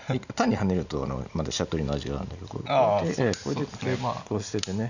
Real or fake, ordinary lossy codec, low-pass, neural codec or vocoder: real; none; 7.2 kHz; none